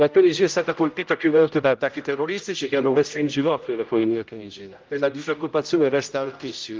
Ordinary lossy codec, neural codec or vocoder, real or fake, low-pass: Opus, 24 kbps; codec, 16 kHz, 0.5 kbps, X-Codec, HuBERT features, trained on general audio; fake; 7.2 kHz